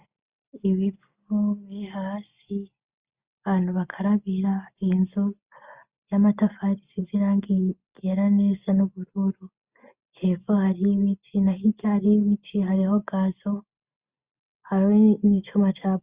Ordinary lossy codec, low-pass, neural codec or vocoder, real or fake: Opus, 64 kbps; 3.6 kHz; none; real